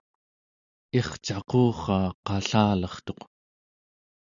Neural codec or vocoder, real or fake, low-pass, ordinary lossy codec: none; real; 7.2 kHz; Opus, 64 kbps